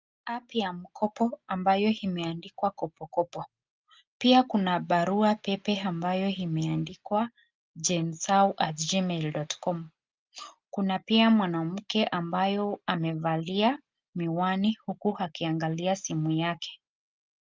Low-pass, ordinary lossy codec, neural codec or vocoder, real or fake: 7.2 kHz; Opus, 32 kbps; none; real